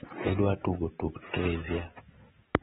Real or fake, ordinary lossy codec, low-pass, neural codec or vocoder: fake; AAC, 16 kbps; 19.8 kHz; vocoder, 44.1 kHz, 128 mel bands every 256 samples, BigVGAN v2